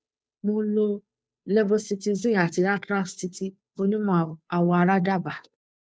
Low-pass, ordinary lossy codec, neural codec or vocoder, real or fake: none; none; codec, 16 kHz, 2 kbps, FunCodec, trained on Chinese and English, 25 frames a second; fake